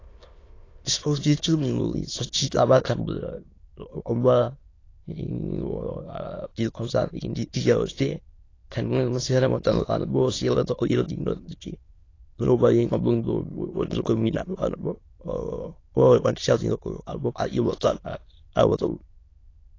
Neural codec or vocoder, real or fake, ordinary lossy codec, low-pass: autoencoder, 22.05 kHz, a latent of 192 numbers a frame, VITS, trained on many speakers; fake; AAC, 32 kbps; 7.2 kHz